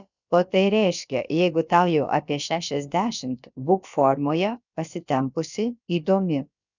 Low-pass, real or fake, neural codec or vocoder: 7.2 kHz; fake; codec, 16 kHz, about 1 kbps, DyCAST, with the encoder's durations